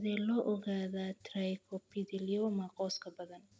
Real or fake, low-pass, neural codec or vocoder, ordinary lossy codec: real; none; none; none